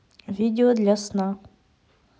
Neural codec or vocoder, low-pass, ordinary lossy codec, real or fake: none; none; none; real